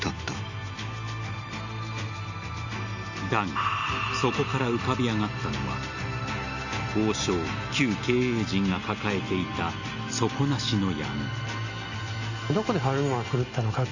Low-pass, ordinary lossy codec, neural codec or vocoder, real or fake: 7.2 kHz; none; none; real